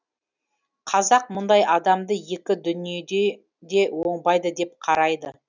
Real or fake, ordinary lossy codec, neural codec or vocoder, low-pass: real; none; none; 7.2 kHz